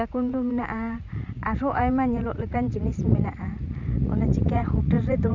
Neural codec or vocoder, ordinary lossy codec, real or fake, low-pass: vocoder, 44.1 kHz, 80 mel bands, Vocos; none; fake; 7.2 kHz